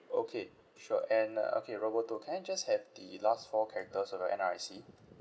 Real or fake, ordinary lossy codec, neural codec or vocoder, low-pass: real; none; none; none